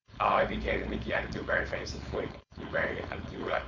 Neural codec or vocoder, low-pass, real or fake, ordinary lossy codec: codec, 16 kHz, 4.8 kbps, FACodec; 7.2 kHz; fake; none